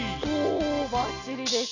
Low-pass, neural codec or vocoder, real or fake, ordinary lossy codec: 7.2 kHz; none; real; none